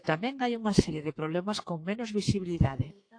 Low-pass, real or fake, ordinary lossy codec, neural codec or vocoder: 9.9 kHz; fake; AAC, 48 kbps; codec, 32 kHz, 1.9 kbps, SNAC